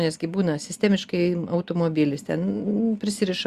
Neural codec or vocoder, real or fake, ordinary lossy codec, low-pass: none; real; Opus, 64 kbps; 14.4 kHz